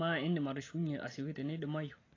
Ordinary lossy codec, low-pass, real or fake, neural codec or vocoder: AAC, 32 kbps; 7.2 kHz; real; none